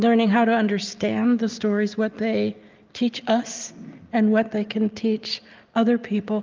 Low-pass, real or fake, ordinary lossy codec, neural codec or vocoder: 7.2 kHz; real; Opus, 24 kbps; none